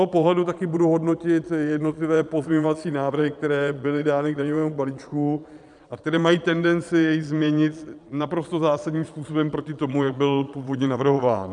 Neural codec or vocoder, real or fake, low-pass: vocoder, 22.05 kHz, 80 mel bands, Vocos; fake; 9.9 kHz